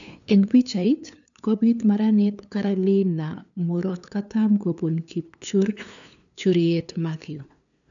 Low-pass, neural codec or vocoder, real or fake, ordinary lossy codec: 7.2 kHz; codec, 16 kHz, 2 kbps, FunCodec, trained on LibriTTS, 25 frames a second; fake; none